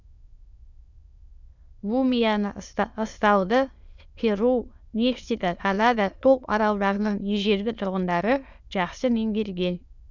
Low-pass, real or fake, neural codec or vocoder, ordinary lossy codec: 7.2 kHz; fake; autoencoder, 22.05 kHz, a latent of 192 numbers a frame, VITS, trained on many speakers; none